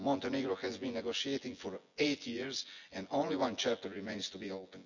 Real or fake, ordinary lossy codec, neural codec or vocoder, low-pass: fake; none; vocoder, 24 kHz, 100 mel bands, Vocos; 7.2 kHz